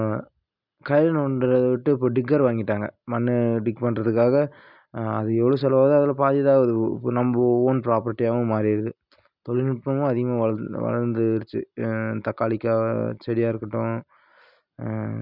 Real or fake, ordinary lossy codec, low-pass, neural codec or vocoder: real; none; 5.4 kHz; none